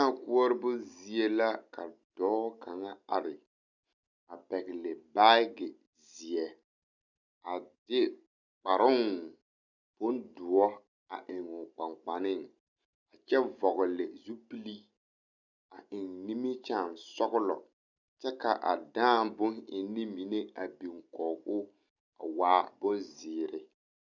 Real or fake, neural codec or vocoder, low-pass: real; none; 7.2 kHz